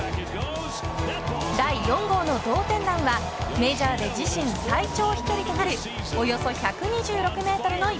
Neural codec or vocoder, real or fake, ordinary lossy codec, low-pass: none; real; none; none